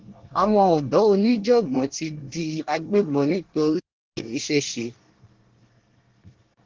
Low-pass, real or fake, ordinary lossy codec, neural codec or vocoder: 7.2 kHz; fake; Opus, 16 kbps; codec, 24 kHz, 1 kbps, SNAC